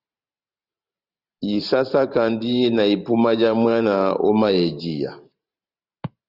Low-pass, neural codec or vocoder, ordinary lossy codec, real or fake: 5.4 kHz; vocoder, 44.1 kHz, 128 mel bands every 512 samples, BigVGAN v2; Opus, 64 kbps; fake